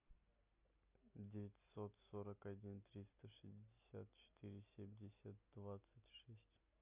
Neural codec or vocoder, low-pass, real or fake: none; 3.6 kHz; real